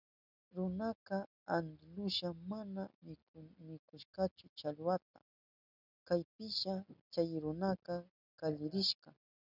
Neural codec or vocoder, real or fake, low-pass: none; real; 5.4 kHz